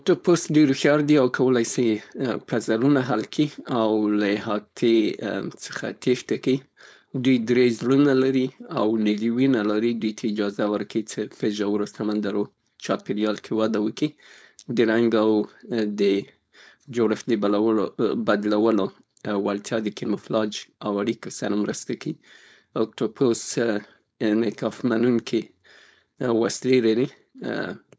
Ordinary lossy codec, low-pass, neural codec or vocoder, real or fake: none; none; codec, 16 kHz, 4.8 kbps, FACodec; fake